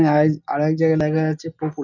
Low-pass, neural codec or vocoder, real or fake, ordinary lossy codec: 7.2 kHz; none; real; none